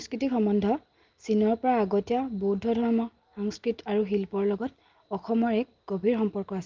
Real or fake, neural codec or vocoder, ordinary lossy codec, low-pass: real; none; Opus, 32 kbps; 7.2 kHz